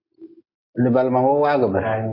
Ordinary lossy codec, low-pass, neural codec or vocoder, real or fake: AAC, 32 kbps; 5.4 kHz; none; real